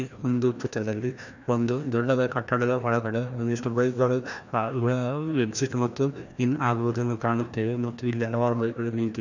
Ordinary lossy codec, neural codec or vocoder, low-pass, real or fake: none; codec, 16 kHz, 1 kbps, FreqCodec, larger model; 7.2 kHz; fake